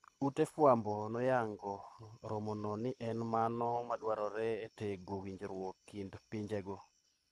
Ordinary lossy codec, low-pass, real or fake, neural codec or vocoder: none; none; fake; codec, 24 kHz, 6 kbps, HILCodec